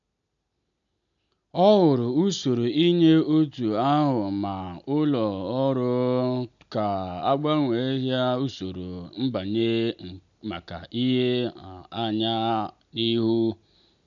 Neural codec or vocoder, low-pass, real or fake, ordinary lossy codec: none; 7.2 kHz; real; none